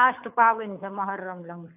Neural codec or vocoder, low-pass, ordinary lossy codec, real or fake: codec, 24 kHz, 6 kbps, HILCodec; 3.6 kHz; AAC, 32 kbps; fake